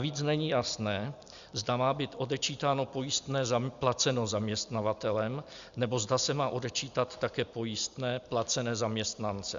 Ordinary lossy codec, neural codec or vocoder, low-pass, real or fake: Opus, 64 kbps; none; 7.2 kHz; real